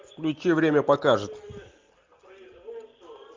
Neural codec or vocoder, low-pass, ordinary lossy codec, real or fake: none; 7.2 kHz; Opus, 32 kbps; real